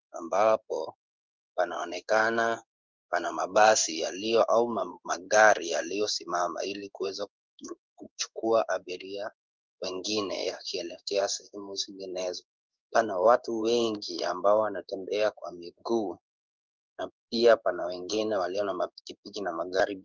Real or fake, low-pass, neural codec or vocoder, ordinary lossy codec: fake; 7.2 kHz; codec, 16 kHz in and 24 kHz out, 1 kbps, XY-Tokenizer; Opus, 32 kbps